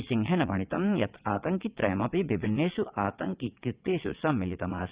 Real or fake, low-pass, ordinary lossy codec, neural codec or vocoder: fake; 3.6 kHz; Opus, 64 kbps; vocoder, 22.05 kHz, 80 mel bands, WaveNeXt